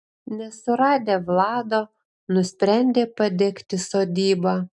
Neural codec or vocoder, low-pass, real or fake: none; 10.8 kHz; real